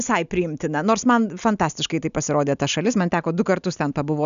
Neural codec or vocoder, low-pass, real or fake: none; 7.2 kHz; real